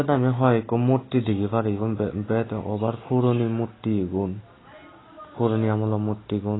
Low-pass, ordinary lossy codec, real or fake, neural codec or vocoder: 7.2 kHz; AAC, 16 kbps; real; none